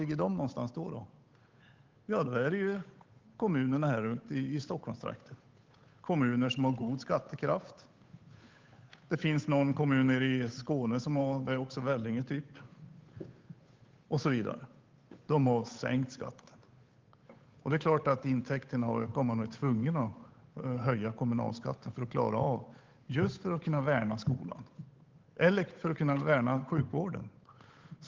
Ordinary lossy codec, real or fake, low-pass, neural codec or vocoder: Opus, 32 kbps; fake; 7.2 kHz; codec, 16 kHz, 8 kbps, FunCodec, trained on Chinese and English, 25 frames a second